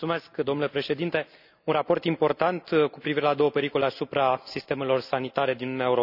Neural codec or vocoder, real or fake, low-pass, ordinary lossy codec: none; real; 5.4 kHz; none